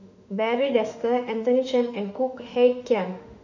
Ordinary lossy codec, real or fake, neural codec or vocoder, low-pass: none; fake; autoencoder, 48 kHz, 32 numbers a frame, DAC-VAE, trained on Japanese speech; 7.2 kHz